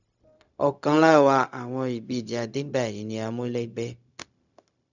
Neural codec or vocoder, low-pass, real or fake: codec, 16 kHz, 0.4 kbps, LongCat-Audio-Codec; 7.2 kHz; fake